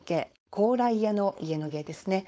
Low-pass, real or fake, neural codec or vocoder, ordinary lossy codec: none; fake; codec, 16 kHz, 4.8 kbps, FACodec; none